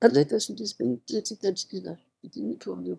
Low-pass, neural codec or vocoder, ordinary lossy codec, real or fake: none; autoencoder, 22.05 kHz, a latent of 192 numbers a frame, VITS, trained on one speaker; none; fake